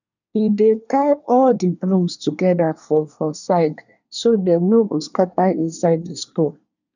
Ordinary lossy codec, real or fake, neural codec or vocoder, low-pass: none; fake; codec, 24 kHz, 1 kbps, SNAC; 7.2 kHz